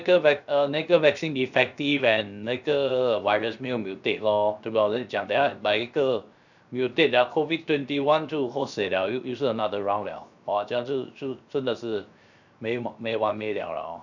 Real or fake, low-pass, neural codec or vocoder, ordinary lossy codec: fake; 7.2 kHz; codec, 16 kHz, 0.7 kbps, FocalCodec; none